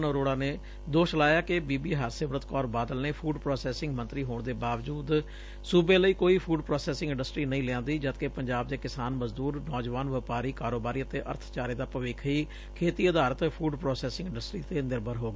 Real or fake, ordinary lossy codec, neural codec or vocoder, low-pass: real; none; none; none